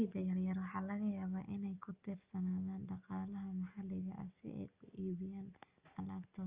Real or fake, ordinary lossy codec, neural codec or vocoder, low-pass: real; Opus, 32 kbps; none; 3.6 kHz